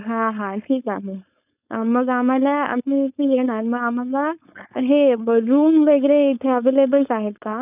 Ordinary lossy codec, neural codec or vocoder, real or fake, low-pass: none; codec, 16 kHz, 4.8 kbps, FACodec; fake; 3.6 kHz